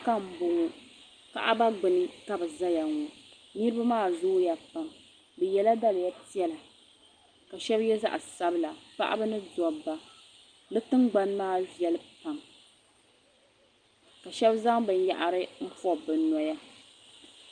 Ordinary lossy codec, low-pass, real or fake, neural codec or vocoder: Opus, 32 kbps; 9.9 kHz; real; none